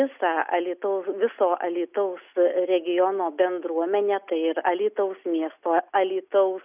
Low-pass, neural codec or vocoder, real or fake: 3.6 kHz; none; real